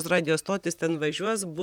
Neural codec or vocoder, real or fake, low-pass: vocoder, 44.1 kHz, 128 mel bands, Pupu-Vocoder; fake; 19.8 kHz